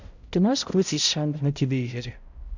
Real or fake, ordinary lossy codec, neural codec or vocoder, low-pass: fake; Opus, 64 kbps; codec, 16 kHz, 0.5 kbps, X-Codec, HuBERT features, trained on balanced general audio; 7.2 kHz